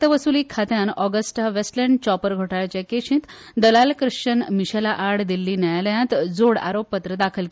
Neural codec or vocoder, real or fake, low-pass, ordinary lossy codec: none; real; none; none